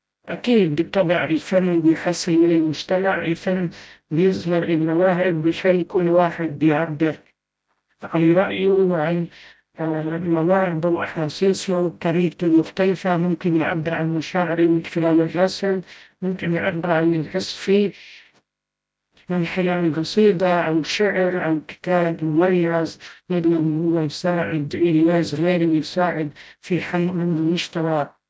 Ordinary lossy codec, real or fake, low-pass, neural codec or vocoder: none; fake; none; codec, 16 kHz, 0.5 kbps, FreqCodec, smaller model